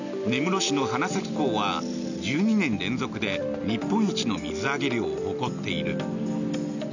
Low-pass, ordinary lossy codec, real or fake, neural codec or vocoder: 7.2 kHz; none; real; none